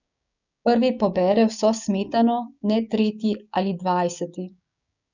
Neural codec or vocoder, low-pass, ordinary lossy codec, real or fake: codec, 16 kHz, 6 kbps, DAC; 7.2 kHz; none; fake